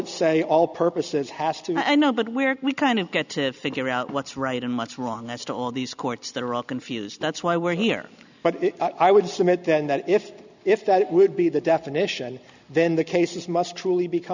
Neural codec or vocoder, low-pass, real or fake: none; 7.2 kHz; real